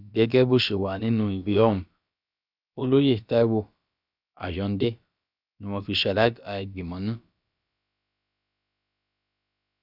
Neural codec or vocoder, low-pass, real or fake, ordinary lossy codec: codec, 16 kHz, about 1 kbps, DyCAST, with the encoder's durations; 5.4 kHz; fake; none